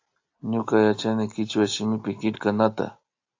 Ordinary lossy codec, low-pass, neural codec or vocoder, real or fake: AAC, 48 kbps; 7.2 kHz; none; real